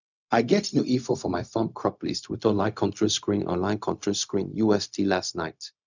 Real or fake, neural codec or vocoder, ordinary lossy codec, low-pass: fake; codec, 16 kHz, 0.4 kbps, LongCat-Audio-Codec; none; 7.2 kHz